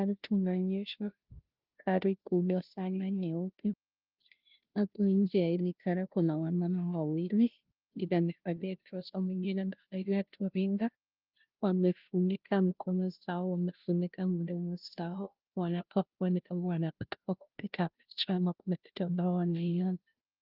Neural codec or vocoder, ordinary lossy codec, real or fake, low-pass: codec, 16 kHz, 0.5 kbps, FunCodec, trained on Chinese and English, 25 frames a second; Opus, 32 kbps; fake; 5.4 kHz